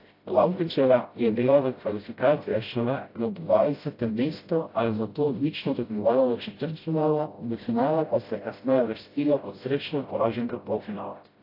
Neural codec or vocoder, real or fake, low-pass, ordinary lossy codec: codec, 16 kHz, 0.5 kbps, FreqCodec, smaller model; fake; 5.4 kHz; AAC, 32 kbps